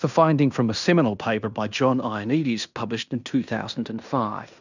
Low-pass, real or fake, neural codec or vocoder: 7.2 kHz; fake; codec, 16 kHz in and 24 kHz out, 0.9 kbps, LongCat-Audio-Codec, fine tuned four codebook decoder